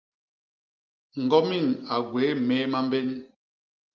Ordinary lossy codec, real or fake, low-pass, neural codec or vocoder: Opus, 24 kbps; real; 7.2 kHz; none